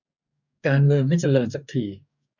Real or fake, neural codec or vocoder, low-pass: fake; codec, 44.1 kHz, 2.6 kbps, DAC; 7.2 kHz